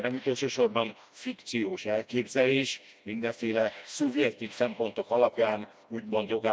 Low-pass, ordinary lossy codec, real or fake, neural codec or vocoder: none; none; fake; codec, 16 kHz, 1 kbps, FreqCodec, smaller model